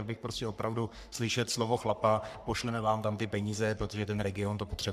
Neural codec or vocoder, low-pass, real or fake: codec, 44.1 kHz, 3.4 kbps, Pupu-Codec; 14.4 kHz; fake